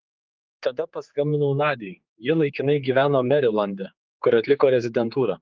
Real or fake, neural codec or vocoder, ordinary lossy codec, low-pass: fake; codec, 16 kHz, 4 kbps, X-Codec, HuBERT features, trained on general audio; Opus, 32 kbps; 7.2 kHz